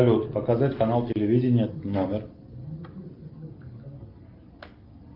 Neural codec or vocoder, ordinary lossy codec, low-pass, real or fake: none; Opus, 32 kbps; 5.4 kHz; real